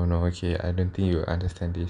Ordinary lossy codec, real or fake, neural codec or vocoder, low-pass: none; real; none; 10.8 kHz